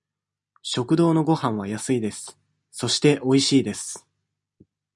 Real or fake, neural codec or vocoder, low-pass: real; none; 10.8 kHz